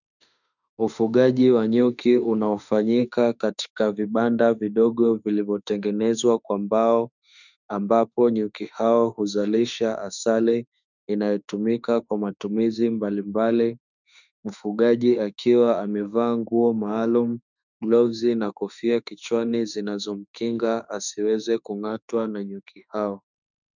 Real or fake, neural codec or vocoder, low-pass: fake; autoencoder, 48 kHz, 32 numbers a frame, DAC-VAE, trained on Japanese speech; 7.2 kHz